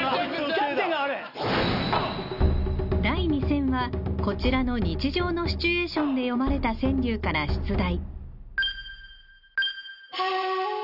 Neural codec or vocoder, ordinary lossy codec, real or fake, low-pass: none; none; real; 5.4 kHz